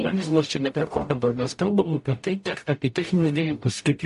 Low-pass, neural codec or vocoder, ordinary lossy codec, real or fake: 14.4 kHz; codec, 44.1 kHz, 0.9 kbps, DAC; MP3, 48 kbps; fake